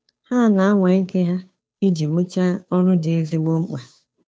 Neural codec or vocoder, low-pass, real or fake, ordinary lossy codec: codec, 16 kHz, 2 kbps, FunCodec, trained on Chinese and English, 25 frames a second; none; fake; none